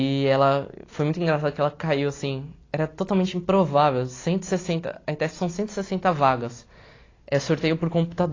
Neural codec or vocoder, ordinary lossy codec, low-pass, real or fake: none; AAC, 32 kbps; 7.2 kHz; real